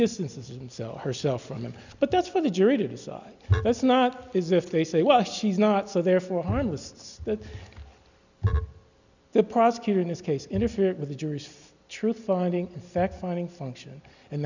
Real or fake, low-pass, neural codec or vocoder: real; 7.2 kHz; none